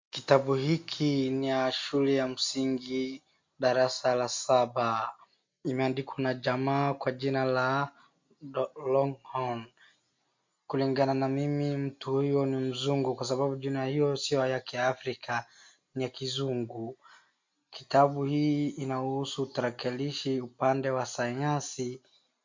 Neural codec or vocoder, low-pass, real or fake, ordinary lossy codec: none; 7.2 kHz; real; MP3, 48 kbps